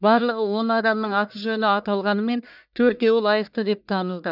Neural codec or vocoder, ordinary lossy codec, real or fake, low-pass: codec, 44.1 kHz, 1.7 kbps, Pupu-Codec; none; fake; 5.4 kHz